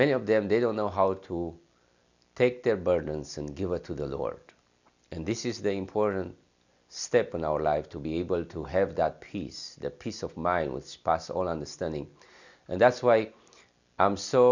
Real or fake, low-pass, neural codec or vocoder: real; 7.2 kHz; none